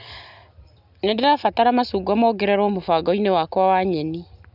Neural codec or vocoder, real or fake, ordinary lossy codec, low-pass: none; real; none; 5.4 kHz